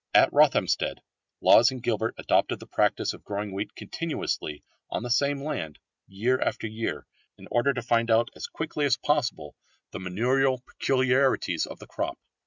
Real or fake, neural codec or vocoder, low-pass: real; none; 7.2 kHz